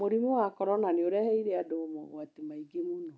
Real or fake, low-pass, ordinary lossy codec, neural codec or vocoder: real; none; none; none